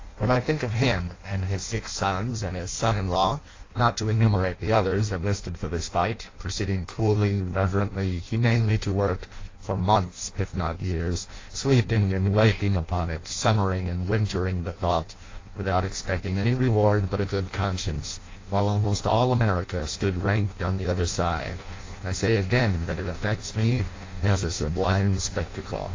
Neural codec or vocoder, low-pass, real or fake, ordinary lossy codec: codec, 16 kHz in and 24 kHz out, 0.6 kbps, FireRedTTS-2 codec; 7.2 kHz; fake; AAC, 32 kbps